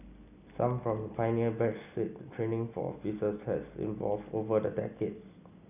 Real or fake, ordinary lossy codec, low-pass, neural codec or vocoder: real; none; 3.6 kHz; none